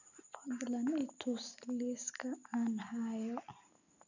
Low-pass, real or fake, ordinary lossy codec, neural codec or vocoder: 7.2 kHz; real; none; none